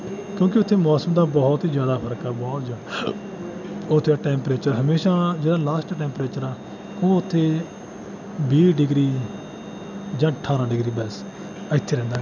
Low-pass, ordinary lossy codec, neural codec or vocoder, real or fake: 7.2 kHz; none; none; real